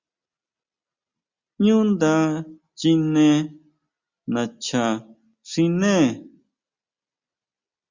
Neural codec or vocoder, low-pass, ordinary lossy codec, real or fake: none; 7.2 kHz; Opus, 64 kbps; real